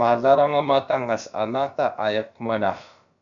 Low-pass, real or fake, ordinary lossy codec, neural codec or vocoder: 7.2 kHz; fake; MP3, 96 kbps; codec, 16 kHz, about 1 kbps, DyCAST, with the encoder's durations